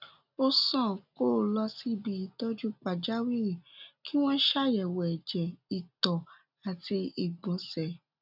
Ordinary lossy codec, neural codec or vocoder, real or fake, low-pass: none; none; real; 5.4 kHz